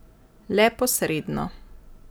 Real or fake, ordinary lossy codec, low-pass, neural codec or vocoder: real; none; none; none